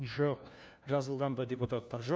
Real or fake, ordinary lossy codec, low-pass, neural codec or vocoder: fake; none; none; codec, 16 kHz, 1 kbps, FunCodec, trained on LibriTTS, 50 frames a second